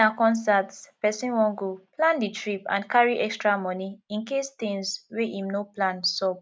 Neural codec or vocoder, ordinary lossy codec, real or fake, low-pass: none; none; real; none